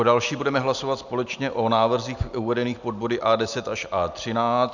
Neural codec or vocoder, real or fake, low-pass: none; real; 7.2 kHz